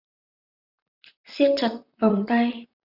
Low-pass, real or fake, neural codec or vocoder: 5.4 kHz; fake; vocoder, 44.1 kHz, 128 mel bands, Pupu-Vocoder